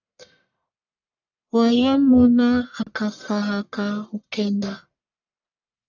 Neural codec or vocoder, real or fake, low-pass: codec, 44.1 kHz, 1.7 kbps, Pupu-Codec; fake; 7.2 kHz